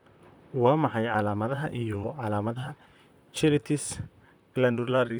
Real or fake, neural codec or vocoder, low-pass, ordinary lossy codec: fake; vocoder, 44.1 kHz, 128 mel bands, Pupu-Vocoder; none; none